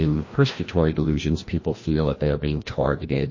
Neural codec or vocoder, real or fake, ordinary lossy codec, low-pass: codec, 16 kHz, 1 kbps, FreqCodec, larger model; fake; MP3, 32 kbps; 7.2 kHz